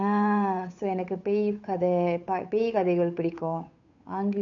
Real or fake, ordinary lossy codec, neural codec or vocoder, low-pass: fake; none; codec, 16 kHz, 8 kbps, FunCodec, trained on Chinese and English, 25 frames a second; 7.2 kHz